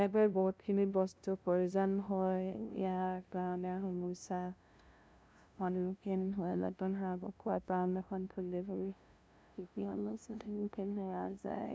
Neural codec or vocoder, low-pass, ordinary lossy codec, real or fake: codec, 16 kHz, 0.5 kbps, FunCodec, trained on LibriTTS, 25 frames a second; none; none; fake